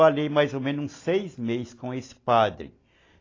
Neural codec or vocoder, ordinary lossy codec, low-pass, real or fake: none; AAC, 32 kbps; 7.2 kHz; real